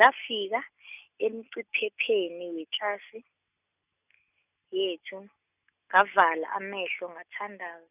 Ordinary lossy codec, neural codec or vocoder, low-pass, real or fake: none; none; 3.6 kHz; real